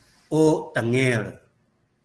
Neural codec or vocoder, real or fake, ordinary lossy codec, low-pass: none; real; Opus, 16 kbps; 10.8 kHz